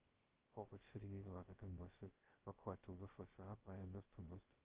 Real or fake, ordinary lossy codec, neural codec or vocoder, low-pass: fake; Opus, 16 kbps; codec, 16 kHz, 0.2 kbps, FocalCodec; 3.6 kHz